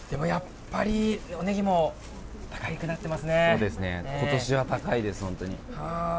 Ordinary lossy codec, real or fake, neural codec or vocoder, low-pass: none; real; none; none